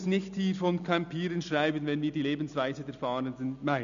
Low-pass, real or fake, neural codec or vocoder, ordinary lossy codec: 7.2 kHz; real; none; none